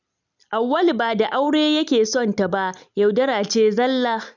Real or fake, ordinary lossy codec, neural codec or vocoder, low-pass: real; none; none; 7.2 kHz